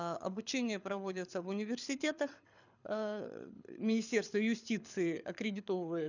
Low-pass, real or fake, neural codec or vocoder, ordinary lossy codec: 7.2 kHz; fake; codec, 24 kHz, 6 kbps, HILCodec; none